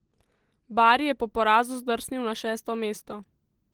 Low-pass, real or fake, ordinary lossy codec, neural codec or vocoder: 19.8 kHz; real; Opus, 16 kbps; none